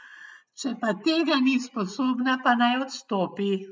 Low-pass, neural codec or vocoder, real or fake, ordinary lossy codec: none; none; real; none